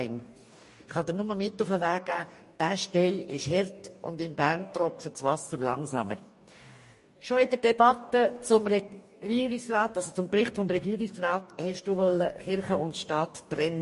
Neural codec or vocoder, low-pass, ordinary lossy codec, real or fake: codec, 44.1 kHz, 2.6 kbps, DAC; 14.4 kHz; MP3, 48 kbps; fake